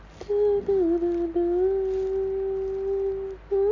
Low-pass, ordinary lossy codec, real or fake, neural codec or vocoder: 7.2 kHz; AAC, 32 kbps; real; none